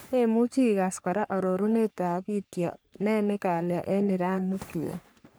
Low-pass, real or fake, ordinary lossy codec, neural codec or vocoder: none; fake; none; codec, 44.1 kHz, 3.4 kbps, Pupu-Codec